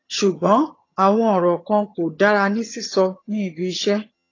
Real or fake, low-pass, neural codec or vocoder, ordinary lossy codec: fake; 7.2 kHz; vocoder, 22.05 kHz, 80 mel bands, HiFi-GAN; AAC, 32 kbps